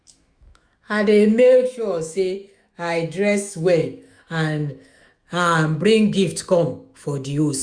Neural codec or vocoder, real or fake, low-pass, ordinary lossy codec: autoencoder, 48 kHz, 128 numbers a frame, DAC-VAE, trained on Japanese speech; fake; 9.9 kHz; Opus, 64 kbps